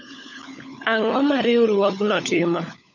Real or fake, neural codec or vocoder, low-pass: fake; codec, 16 kHz, 16 kbps, FunCodec, trained on LibriTTS, 50 frames a second; 7.2 kHz